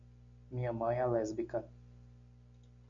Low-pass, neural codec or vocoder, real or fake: 7.2 kHz; none; real